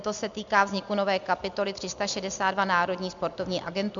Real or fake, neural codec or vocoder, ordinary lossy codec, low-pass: real; none; MP3, 64 kbps; 7.2 kHz